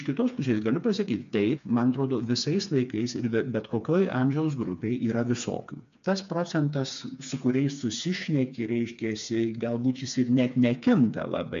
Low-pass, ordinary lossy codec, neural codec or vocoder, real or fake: 7.2 kHz; MP3, 64 kbps; codec, 16 kHz, 4 kbps, FreqCodec, smaller model; fake